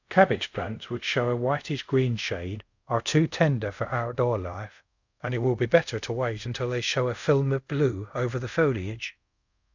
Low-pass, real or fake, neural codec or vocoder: 7.2 kHz; fake; codec, 24 kHz, 0.5 kbps, DualCodec